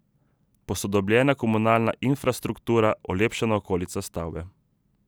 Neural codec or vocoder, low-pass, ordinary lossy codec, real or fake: none; none; none; real